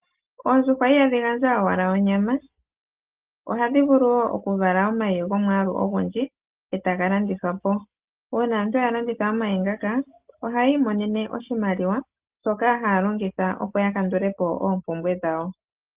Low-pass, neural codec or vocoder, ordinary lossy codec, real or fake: 3.6 kHz; none; Opus, 32 kbps; real